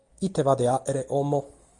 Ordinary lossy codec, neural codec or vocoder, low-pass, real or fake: Opus, 32 kbps; none; 10.8 kHz; real